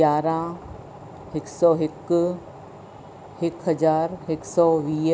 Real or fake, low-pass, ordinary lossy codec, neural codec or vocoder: real; none; none; none